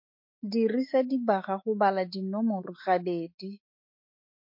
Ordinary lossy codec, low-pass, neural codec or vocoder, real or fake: MP3, 32 kbps; 5.4 kHz; autoencoder, 48 kHz, 128 numbers a frame, DAC-VAE, trained on Japanese speech; fake